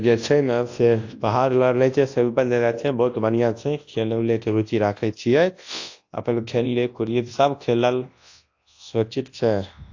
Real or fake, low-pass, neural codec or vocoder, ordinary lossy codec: fake; 7.2 kHz; codec, 24 kHz, 0.9 kbps, WavTokenizer, large speech release; AAC, 48 kbps